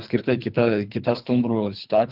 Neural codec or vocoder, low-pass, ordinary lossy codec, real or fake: codec, 24 kHz, 3 kbps, HILCodec; 5.4 kHz; Opus, 24 kbps; fake